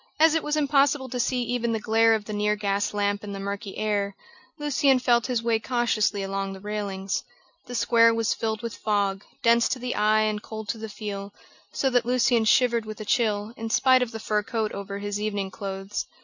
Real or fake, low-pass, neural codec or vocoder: real; 7.2 kHz; none